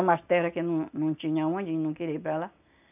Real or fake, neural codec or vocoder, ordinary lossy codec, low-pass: real; none; none; 3.6 kHz